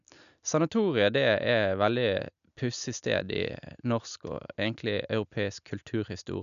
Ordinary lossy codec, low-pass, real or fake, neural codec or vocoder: none; 7.2 kHz; real; none